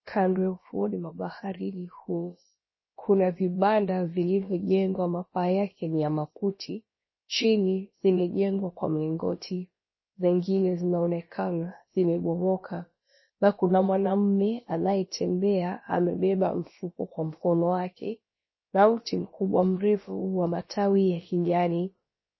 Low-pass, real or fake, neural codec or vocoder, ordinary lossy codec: 7.2 kHz; fake; codec, 16 kHz, about 1 kbps, DyCAST, with the encoder's durations; MP3, 24 kbps